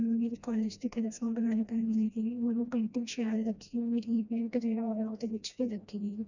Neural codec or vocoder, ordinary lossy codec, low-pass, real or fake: codec, 16 kHz, 1 kbps, FreqCodec, smaller model; none; 7.2 kHz; fake